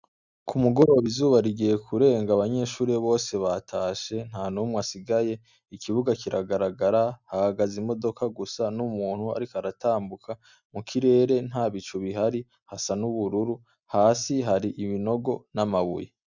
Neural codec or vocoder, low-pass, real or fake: none; 7.2 kHz; real